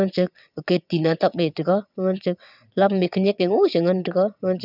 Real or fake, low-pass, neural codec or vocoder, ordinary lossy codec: fake; 5.4 kHz; vocoder, 22.05 kHz, 80 mel bands, WaveNeXt; none